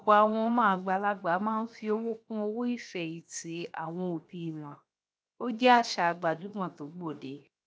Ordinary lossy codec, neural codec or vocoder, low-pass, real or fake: none; codec, 16 kHz, 0.7 kbps, FocalCodec; none; fake